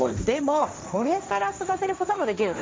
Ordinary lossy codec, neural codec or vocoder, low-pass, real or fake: none; codec, 16 kHz, 1.1 kbps, Voila-Tokenizer; none; fake